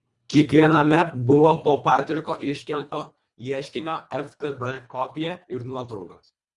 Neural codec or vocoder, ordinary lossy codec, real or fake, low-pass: codec, 24 kHz, 1.5 kbps, HILCodec; Opus, 64 kbps; fake; 10.8 kHz